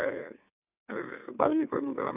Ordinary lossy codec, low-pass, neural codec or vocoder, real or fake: none; 3.6 kHz; autoencoder, 44.1 kHz, a latent of 192 numbers a frame, MeloTTS; fake